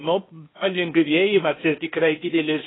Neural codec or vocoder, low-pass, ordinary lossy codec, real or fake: codec, 16 kHz, 0.8 kbps, ZipCodec; 7.2 kHz; AAC, 16 kbps; fake